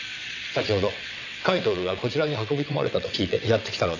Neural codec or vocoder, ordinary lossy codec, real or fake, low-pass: vocoder, 22.05 kHz, 80 mel bands, WaveNeXt; none; fake; 7.2 kHz